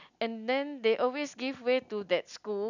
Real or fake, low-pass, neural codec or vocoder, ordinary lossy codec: real; 7.2 kHz; none; none